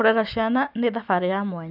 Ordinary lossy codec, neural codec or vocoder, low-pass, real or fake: none; none; 5.4 kHz; real